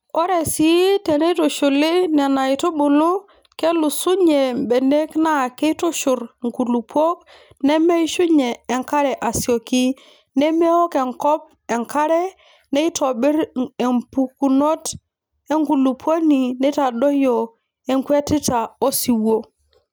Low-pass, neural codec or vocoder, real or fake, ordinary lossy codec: none; none; real; none